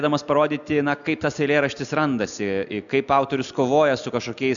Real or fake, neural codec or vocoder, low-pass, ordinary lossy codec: real; none; 7.2 kHz; MP3, 96 kbps